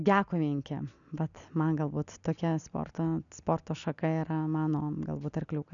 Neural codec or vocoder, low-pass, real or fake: none; 7.2 kHz; real